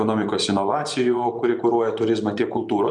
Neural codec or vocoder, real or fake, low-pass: vocoder, 24 kHz, 100 mel bands, Vocos; fake; 10.8 kHz